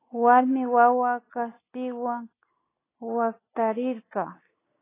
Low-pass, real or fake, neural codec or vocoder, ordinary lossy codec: 3.6 kHz; real; none; AAC, 16 kbps